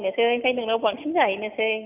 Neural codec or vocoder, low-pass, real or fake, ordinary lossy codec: none; 3.6 kHz; real; none